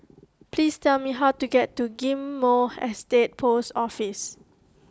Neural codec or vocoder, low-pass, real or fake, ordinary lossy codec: none; none; real; none